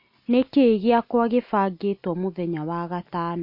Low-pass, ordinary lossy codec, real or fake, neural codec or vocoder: 5.4 kHz; MP3, 32 kbps; real; none